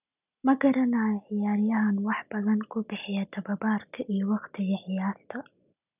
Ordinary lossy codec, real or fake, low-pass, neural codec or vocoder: none; real; 3.6 kHz; none